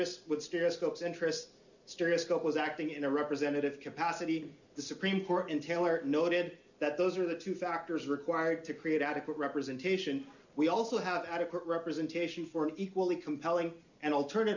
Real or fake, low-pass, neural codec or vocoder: real; 7.2 kHz; none